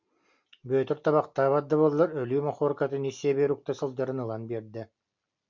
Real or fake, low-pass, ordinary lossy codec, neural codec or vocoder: real; 7.2 kHz; MP3, 64 kbps; none